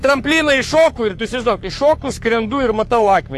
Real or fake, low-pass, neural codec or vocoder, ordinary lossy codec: fake; 14.4 kHz; codec, 44.1 kHz, 3.4 kbps, Pupu-Codec; AAC, 64 kbps